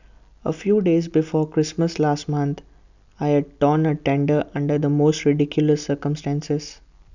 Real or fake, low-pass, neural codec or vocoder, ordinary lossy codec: real; 7.2 kHz; none; none